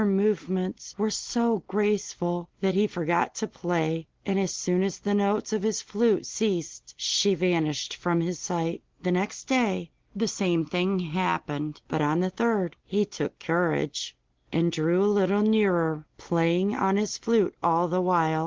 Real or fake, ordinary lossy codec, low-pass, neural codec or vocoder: real; Opus, 16 kbps; 7.2 kHz; none